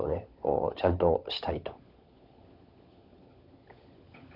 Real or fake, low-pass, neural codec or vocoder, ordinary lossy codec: fake; 5.4 kHz; codec, 16 kHz, 16 kbps, FunCodec, trained on LibriTTS, 50 frames a second; none